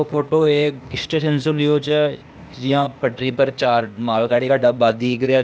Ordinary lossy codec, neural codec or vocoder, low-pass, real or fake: none; codec, 16 kHz, 0.8 kbps, ZipCodec; none; fake